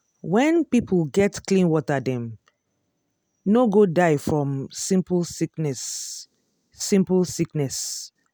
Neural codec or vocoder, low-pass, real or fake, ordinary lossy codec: none; none; real; none